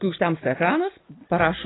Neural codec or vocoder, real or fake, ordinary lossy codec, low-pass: none; real; AAC, 16 kbps; 7.2 kHz